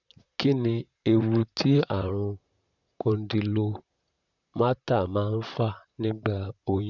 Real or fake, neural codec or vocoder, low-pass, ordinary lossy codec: fake; vocoder, 44.1 kHz, 128 mel bands, Pupu-Vocoder; 7.2 kHz; none